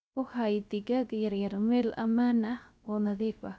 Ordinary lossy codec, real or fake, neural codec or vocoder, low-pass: none; fake; codec, 16 kHz, 0.3 kbps, FocalCodec; none